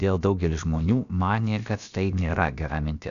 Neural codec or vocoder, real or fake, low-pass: codec, 16 kHz, about 1 kbps, DyCAST, with the encoder's durations; fake; 7.2 kHz